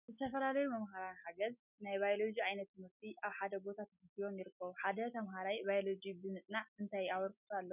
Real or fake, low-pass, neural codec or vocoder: real; 3.6 kHz; none